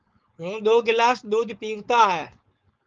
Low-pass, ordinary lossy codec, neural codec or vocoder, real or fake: 7.2 kHz; Opus, 24 kbps; codec, 16 kHz, 4.8 kbps, FACodec; fake